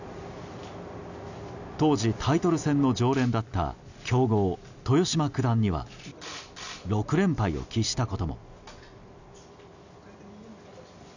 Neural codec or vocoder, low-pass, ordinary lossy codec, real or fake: none; 7.2 kHz; none; real